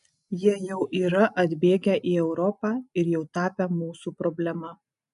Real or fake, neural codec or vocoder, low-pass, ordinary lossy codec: real; none; 10.8 kHz; MP3, 96 kbps